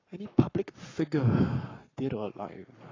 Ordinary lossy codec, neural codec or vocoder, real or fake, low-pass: AAC, 32 kbps; codec, 44.1 kHz, 7.8 kbps, Pupu-Codec; fake; 7.2 kHz